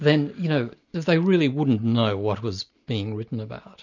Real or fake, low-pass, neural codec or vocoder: real; 7.2 kHz; none